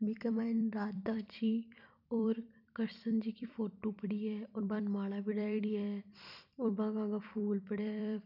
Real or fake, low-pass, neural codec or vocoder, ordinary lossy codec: fake; 5.4 kHz; vocoder, 44.1 kHz, 128 mel bands every 256 samples, BigVGAN v2; none